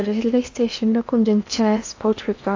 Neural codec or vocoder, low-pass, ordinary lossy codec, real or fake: codec, 16 kHz in and 24 kHz out, 0.8 kbps, FocalCodec, streaming, 65536 codes; 7.2 kHz; AAC, 48 kbps; fake